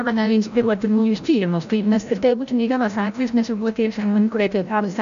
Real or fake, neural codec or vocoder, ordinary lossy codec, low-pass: fake; codec, 16 kHz, 0.5 kbps, FreqCodec, larger model; AAC, 96 kbps; 7.2 kHz